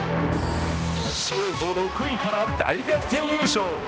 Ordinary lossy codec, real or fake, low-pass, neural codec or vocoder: none; fake; none; codec, 16 kHz, 1 kbps, X-Codec, HuBERT features, trained on balanced general audio